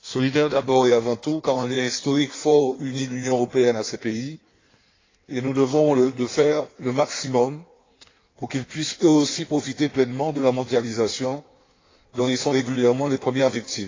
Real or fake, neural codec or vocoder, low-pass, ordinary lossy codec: fake; codec, 16 kHz in and 24 kHz out, 1.1 kbps, FireRedTTS-2 codec; 7.2 kHz; AAC, 32 kbps